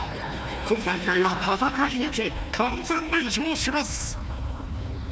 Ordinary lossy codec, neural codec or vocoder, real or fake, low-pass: none; codec, 16 kHz, 1 kbps, FunCodec, trained on Chinese and English, 50 frames a second; fake; none